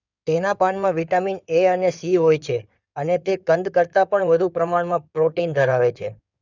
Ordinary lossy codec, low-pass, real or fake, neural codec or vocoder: none; 7.2 kHz; fake; codec, 16 kHz in and 24 kHz out, 2.2 kbps, FireRedTTS-2 codec